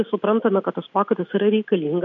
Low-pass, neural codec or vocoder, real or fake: 7.2 kHz; none; real